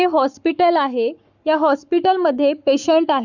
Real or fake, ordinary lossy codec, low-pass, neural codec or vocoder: fake; none; 7.2 kHz; codec, 44.1 kHz, 7.8 kbps, Pupu-Codec